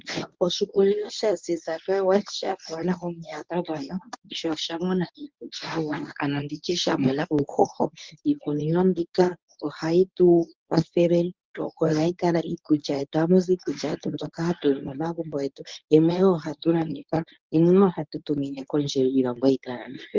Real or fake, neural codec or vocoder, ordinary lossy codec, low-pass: fake; codec, 24 kHz, 0.9 kbps, WavTokenizer, medium speech release version 1; Opus, 32 kbps; 7.2 kHz